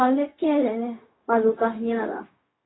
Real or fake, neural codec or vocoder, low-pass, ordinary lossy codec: fake; codec, 16 kHz, 0.4 kbps, LongCat-Audio-Codec; 7.2 kHz; AAC, 16 kbps